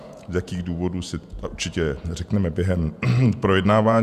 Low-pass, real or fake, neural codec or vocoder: 14.4 kHz; fake; vocoder, 48 kHz, 128 mel bands, Vocos